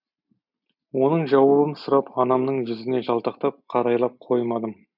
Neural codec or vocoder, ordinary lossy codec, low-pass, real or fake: none; none; 5.4 kHz; real